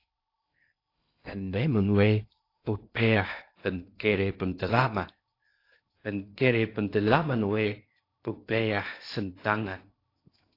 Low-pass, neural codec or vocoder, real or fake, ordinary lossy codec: 5.4 kHz; codec, 16 kHz in and 24 kHz out, 0.6 kbps, FocalCodec, streaming, 2048 codes; fake; AAC, 32 kbps